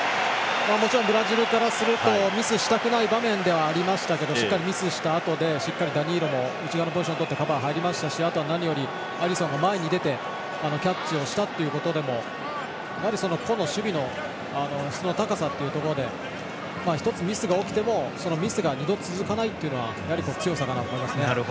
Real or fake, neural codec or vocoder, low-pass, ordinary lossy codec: real; none; none; none